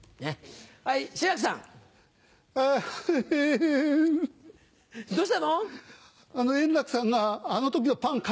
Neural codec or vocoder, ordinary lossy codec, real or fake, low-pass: none; none; real; none